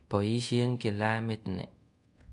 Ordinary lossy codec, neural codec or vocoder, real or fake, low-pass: MP3, 64 kbps; codec, 24 kHz, 0.5 kbps, DualCodec; fake; 10.8 kHz